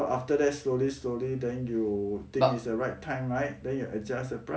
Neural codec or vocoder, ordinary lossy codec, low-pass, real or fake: none; none; none; real